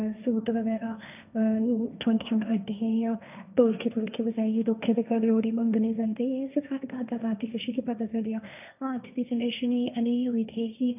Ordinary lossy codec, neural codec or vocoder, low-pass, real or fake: none; codec, 16 kHz, 1.1 kbps, Voila-Tokenizer; 3.6 kHz; fake